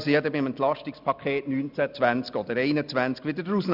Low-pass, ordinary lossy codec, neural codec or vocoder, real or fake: 5.4 kHz; none; none; real